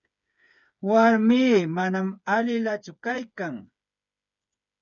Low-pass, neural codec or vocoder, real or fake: 7.2 kHz; codec, 16 kHz, 8 kbps, FreqCodec, smaller model; fake